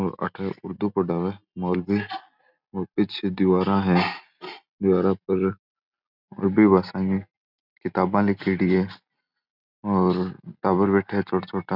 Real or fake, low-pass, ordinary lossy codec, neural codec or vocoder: real; 5.4 kHz; none; none